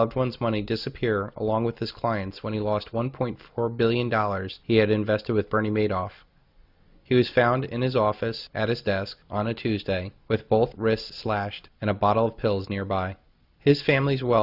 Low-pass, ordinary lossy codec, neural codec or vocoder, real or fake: 5.4 kHz; Opus, 64 kbps; none; real